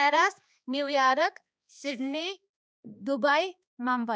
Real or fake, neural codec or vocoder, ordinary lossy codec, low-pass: fake; codec, 16 kHz, 2 kbps, X-Codec, HuBERT features, trained on balanced general audio; none; none